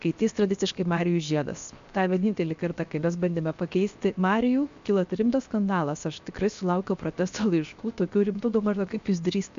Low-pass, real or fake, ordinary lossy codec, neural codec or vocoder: 7.2 kHz; fake; MP3, 64 kbps; codec, 16 kHz, 0.7 kbps, FocalCodec